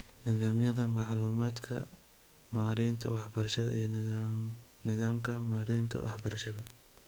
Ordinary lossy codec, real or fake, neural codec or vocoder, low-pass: none; fake; codec, 44.1 kHz, 2.6 kbps, SNAC; none